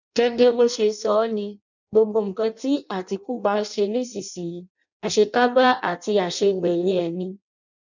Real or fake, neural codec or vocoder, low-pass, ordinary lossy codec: fake; codec, 16 kHz in and 24 kHz out, 0.6 kbps, FireRedTTS-2 codec; 7.2 kHz; none